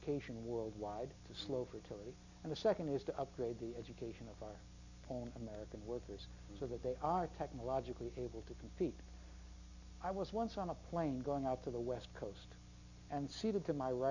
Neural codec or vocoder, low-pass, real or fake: none; 7.2 kHz; real